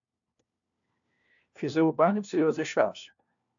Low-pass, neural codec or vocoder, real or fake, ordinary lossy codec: 7.2 kHz; codec, 16 kHz, 1 kbps, FunCodec, trained on LibriTTS, 50 frames a second; fake; AAC, 64 kbps